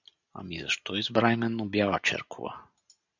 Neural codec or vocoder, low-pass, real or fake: none; 7.2 kHz; real